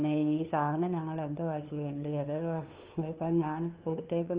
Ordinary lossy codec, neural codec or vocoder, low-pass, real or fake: Opus, 24 kbps; codec, 16 kHz, 2 kbps, FunCodec, trained on LibriTTS, 25 frames a second; 3.6 kHz; fake